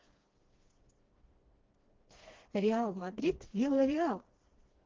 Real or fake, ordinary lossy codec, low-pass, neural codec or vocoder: fake; Opus, 16 kbps; 7.2 kHz; codec, 16 kHz, 2 kbps, FreqCodec, smaller model